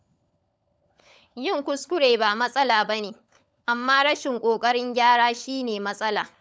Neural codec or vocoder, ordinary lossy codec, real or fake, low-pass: codec, 16 kHz, 16 kbps, FunCodec, trained on LibriTTS, 50 frames a second; none; fake; none